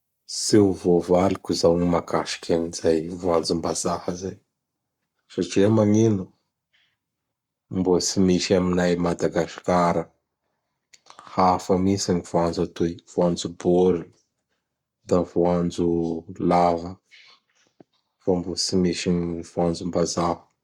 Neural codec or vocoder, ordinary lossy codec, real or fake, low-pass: codec, 44.1 kHz, 7.8 kbps, Pupu-Codec; Opus, 64 kbps; fake; 19.8 kHz